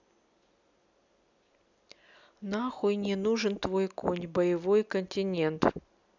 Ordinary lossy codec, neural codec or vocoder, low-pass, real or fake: none; none; 7.2 kHz; real